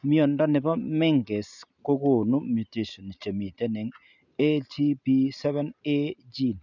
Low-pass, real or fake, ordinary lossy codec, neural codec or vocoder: 7.2 kHz; real; none; none